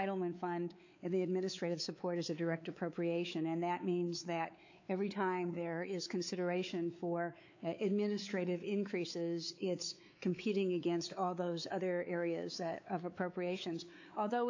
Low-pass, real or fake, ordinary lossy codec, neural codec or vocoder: 7.2 kHz; fake; AAC, 48 kbps; codec, 16 kHz, 4 kbps, X-Codec, WavLM features, trained on Multilingual LibriSpeech